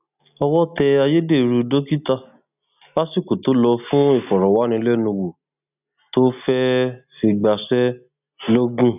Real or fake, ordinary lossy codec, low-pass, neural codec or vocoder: real; none; 3.6 kHz; none